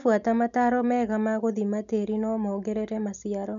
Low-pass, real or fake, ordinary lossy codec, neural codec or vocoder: 7.2 kHz; real; none; none